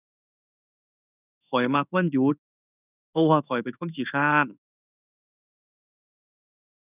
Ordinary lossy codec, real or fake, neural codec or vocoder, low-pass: none; fake; codec, 16 kHz in and 24 kHz out, 1 kbps, XY-Tokenizer; 3.6 kHz